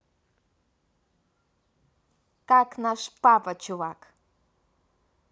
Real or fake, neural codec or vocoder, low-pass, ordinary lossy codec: real; none; none; none